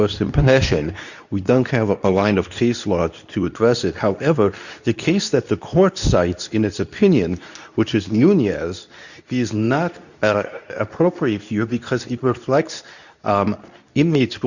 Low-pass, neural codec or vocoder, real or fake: 7.2 kHz; codec, 24 kHz, 0.9 kbps, WavTokenizer, medium speech release version 2; fake